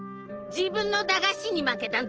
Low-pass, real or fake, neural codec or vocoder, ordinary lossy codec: 7.2 kHz; real; none; Opus, 16 kbps